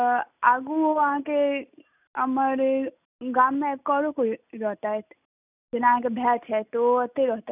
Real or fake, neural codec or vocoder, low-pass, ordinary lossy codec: real; none; 3.6 kHz; none